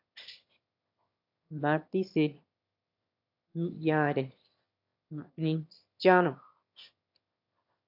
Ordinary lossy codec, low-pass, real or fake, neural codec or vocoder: AAC, 48 kbps; 5.4 kHz; fake; autoencoder, 22.05 kHz, a latent of 192 numbers a frame, VITS, trained on one speaker